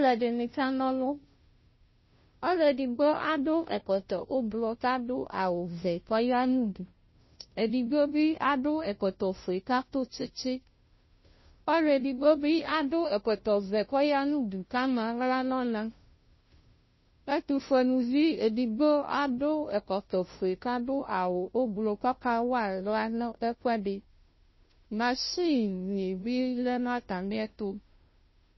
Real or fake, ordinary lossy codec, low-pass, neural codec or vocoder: fake; MP3, 24 kbps; 7.2 kHz; codec, 16 kHz, 0.5 kbps, FunCodec, trained on Chinese and English, 25 frames a second